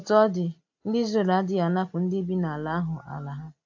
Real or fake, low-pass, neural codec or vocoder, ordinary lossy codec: real; 7.2 kHz; none; AAC, 48 kbps